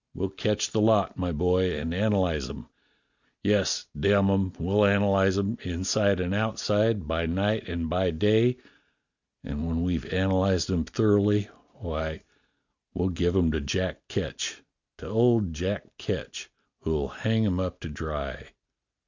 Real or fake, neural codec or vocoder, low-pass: real; none; 7.2 kHz